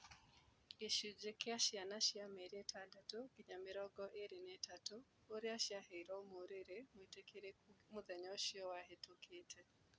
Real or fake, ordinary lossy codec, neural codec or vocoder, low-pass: real; none; none; none